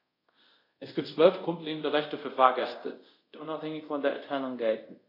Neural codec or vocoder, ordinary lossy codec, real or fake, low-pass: codec, 24 kHz, 0.5 kbps, DualCodec; AAC, 32 kbps; fake; 5.4 kHz